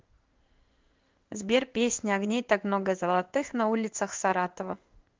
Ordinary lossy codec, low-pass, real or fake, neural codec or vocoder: Opus, 32 kbps; 7.2 kHz; fake; codec, 16 kHz in and 24 kHz out, 1 kbps, XY-Tokenizer